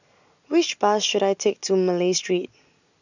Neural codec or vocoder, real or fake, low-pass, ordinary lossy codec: none; real; 7.2 kHz; none